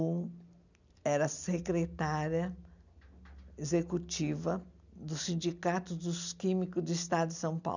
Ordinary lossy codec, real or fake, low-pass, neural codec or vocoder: none; real; 7.2 kHz; none